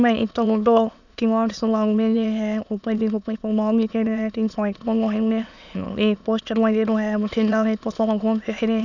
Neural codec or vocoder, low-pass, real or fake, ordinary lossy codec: autoencoder, 22.05 kHz, a latent of 192 numbers a frame, VITS, trained on many speakers; 7.2 kHz; fake; none